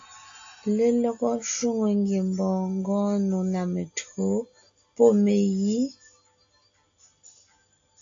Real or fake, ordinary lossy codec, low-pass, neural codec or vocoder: real; AAC, 48 kbps; 7.2 kHz; none